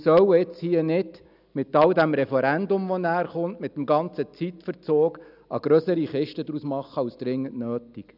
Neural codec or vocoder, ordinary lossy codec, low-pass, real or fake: none; none; 5.4 kHz; real